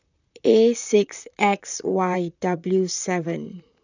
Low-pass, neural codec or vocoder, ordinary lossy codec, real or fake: 7.2 kHz; vocoder, 44.1 kHz, 128 mel bands, Pupu-Vocoder; none; fake